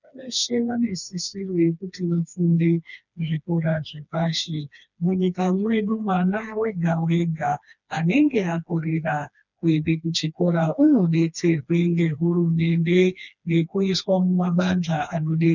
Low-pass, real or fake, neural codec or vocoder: 7.2 kHz; fake; codec, 16 kHz, 2 kbps, FreqCodec, smaller model